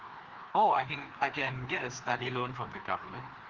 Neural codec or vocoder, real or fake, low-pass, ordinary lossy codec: codec, 16 kHz, 2 kbps, FreqCodec, larger model; fake; 7.2 kHz; Opus, 16 kbps